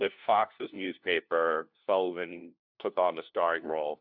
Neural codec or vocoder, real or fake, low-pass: codec, 16 kHz, 1 kbps, FunCodec, trained on LibriTTS, 50 frames a second; fake; 5.4 kHz